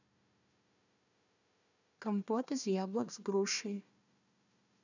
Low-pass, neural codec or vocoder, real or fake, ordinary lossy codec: 7.2 kHz; codec, 16 kHz, 1 kbps, FunCodec, trained on Chinese and English, 50 frames a second; fake; none